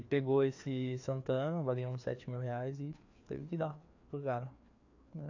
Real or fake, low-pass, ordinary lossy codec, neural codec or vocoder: fake; 7.2 kHz; AAC, 48 kbps; codec, 16 kHz, 2 kbps, FreqCodec, larger model